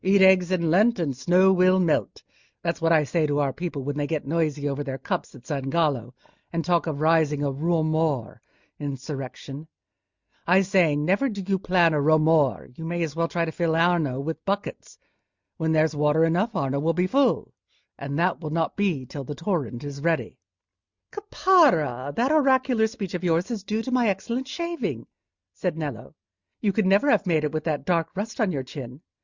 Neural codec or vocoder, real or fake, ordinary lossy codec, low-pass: none; real; Opus, 64 kbps; 7.2 kHz